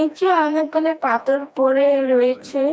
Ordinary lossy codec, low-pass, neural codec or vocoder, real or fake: none; none; codec, 16 kHz, 1 kbps, FreqCodec, smaller model; fake